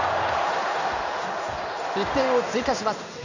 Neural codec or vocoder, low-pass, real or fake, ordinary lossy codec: codec, 16 kHz in and 24 kHz out, 1 kbps, XY-Tokenizer; 7.2 kHz; fake; none